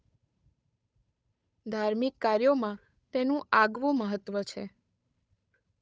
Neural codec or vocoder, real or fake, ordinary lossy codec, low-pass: codec, 16 kHz, 8 kbps, FunCodec, trained on Chinese and English, 25 frames a second; fake; none; none